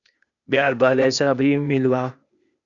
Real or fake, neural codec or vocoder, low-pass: fake; codec, 16 kHz, 0.8 kbps, ZipCodec; 7.2 kHz